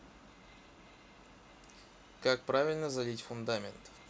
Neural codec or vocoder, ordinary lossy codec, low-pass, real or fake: none; none; none; real